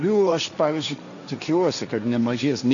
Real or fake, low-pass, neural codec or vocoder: fake; 7.2 kHz; codec, 16 kHz, 1.1 kbps, Voila-Tokenizer